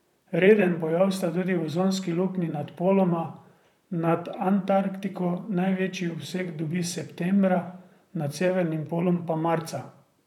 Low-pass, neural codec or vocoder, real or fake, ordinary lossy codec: 19.8 kHz; vocoder, 44.1 kHz, 128 mel bands, Pupu-Vocoder; fake; none